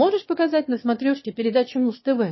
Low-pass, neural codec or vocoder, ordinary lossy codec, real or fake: 7.2 kHz; autoencoder, 22.05 kHz, a latent of 192 numbers a frame, VITS, trained on one speaker; MP3, 24 kbps; fake